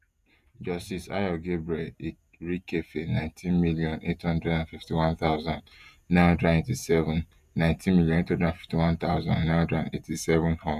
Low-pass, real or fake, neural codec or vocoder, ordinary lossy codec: 14.4 kHz; fake; vocoder, 48 kHz, 128 mel bands, Vocos; none